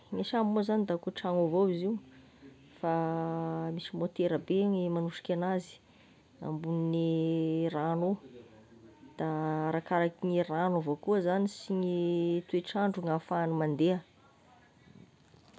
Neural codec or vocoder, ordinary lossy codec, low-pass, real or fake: none; none; none; real